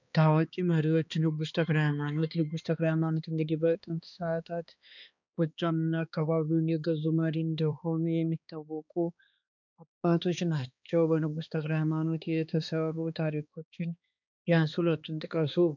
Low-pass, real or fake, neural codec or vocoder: 7.2 kHz; fake; codec, 16 kHz, 2 kbps, X-Codec, HuBERT features, trained on balanced general audio